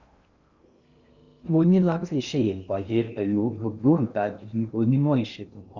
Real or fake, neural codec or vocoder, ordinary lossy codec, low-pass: fake; codec, 16 kHz in and 24 kHz out, 0.6 kbps, FocalCodec, streaming, 2048 codes; Opus, 64 kbps; 7.2 kHz